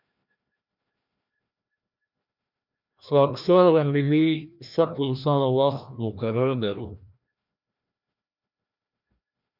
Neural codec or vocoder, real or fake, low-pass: codec, 16 kHz, 1 kbps, FreqCodec, larger model; fake; 5.4 kHz